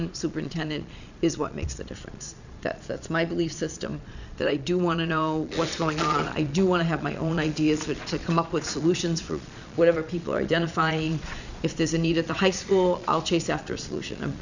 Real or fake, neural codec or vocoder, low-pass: fake; vocoder, 22.05 kHz, 80 mel bands, Vocos; 7.2 kHz